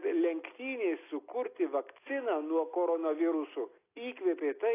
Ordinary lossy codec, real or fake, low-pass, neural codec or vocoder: AAC, 24 kbps; real; 3.6 kHz; none